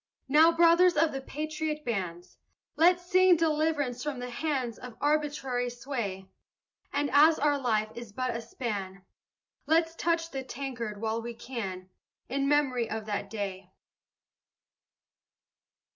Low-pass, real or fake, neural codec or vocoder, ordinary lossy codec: 7.2 kHz; real; none; MP3, 64 kbps